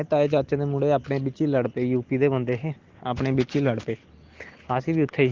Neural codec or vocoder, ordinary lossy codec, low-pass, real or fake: none; Opus, 16 kbps; 7.2 kHz; real